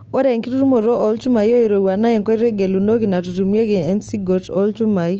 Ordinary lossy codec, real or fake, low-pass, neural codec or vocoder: Opus, 24 kbps; fake; 7.2 kHz; codec, 16 kHz, 6 kbps, DAC